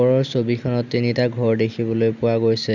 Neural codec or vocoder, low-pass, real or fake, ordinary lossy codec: none; 7.2 kHz; real; none